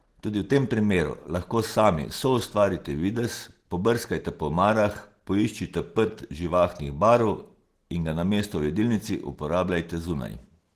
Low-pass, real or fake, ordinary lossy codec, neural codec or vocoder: 14.4 kHz; real; Opus, 16 kbps; none